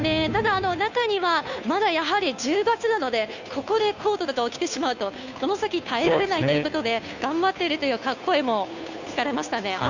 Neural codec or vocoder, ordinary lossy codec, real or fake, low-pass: codec, 16 kHz, 2 kbps, FunCodec, trained on Chinese and English, 25 frames a second; none; fake; 7.2 kHz